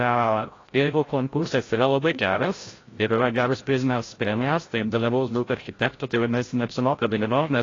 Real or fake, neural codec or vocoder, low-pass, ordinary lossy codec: fake; codec, 16 kHz, 0.5 kbps, FreqCodec, larger model; 7.2 kHz; AAC, 32 kbps